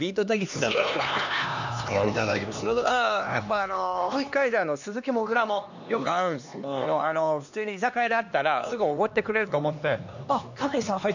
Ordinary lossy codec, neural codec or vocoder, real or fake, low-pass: none; codec, 16 kHz, 2 kbps, X-Codec, HuBERT features, trained on LibriSpeech; fake; 7.2 kHz